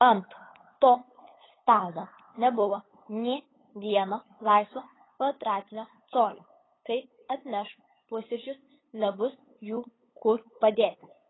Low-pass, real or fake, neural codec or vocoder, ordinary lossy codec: 7.2 kHz; fake; codec, 16 kHz, 8 kbps, FunCodec, trained on LibriTTS, 25 frames a second; AAC, 16 kbps